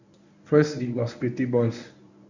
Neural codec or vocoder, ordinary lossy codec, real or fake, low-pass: codec, 24 kHz, 0.9 kbps, WavTokenizer, medium speech release version 1; none; fake; 7.2 kHz